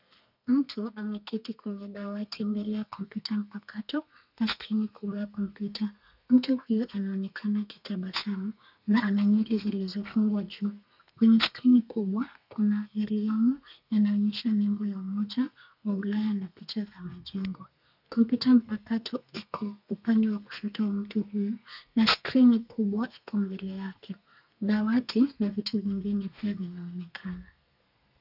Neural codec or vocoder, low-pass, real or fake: codec, 32 kHz, 1.9 kbps, SNAC; 5.4 kHz; fake